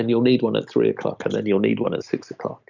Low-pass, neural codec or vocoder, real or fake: 7.2 kHz; none; real